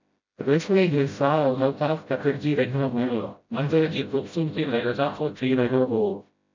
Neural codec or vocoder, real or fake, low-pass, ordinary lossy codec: codec, 16 kHz, 0.5 kbps, FreqCodec, smaller model; fake; 7.2 kHz; MP3, 64 kbps